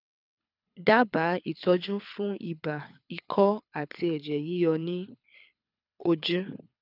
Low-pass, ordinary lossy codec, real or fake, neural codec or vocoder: 5.4 kHz; none; fake; codec, 24 kHz, 6 kbps, HILCodec